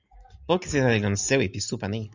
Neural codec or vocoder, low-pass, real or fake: none; 7.2 kHz; real